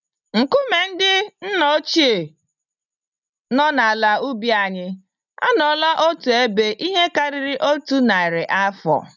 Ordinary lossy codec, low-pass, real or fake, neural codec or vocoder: none; 7.2 kHz; real; none